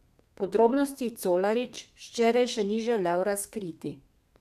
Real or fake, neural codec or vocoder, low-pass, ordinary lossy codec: fake; codec, 32 kHz, 1.9 kbps, SNAC; 14.4 kHz; none